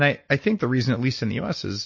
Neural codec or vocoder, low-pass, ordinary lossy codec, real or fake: none; 7.2 kHz; MP3, 32 kbps; real